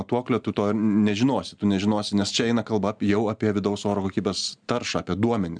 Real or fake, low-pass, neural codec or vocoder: real; 9.9 kHz; none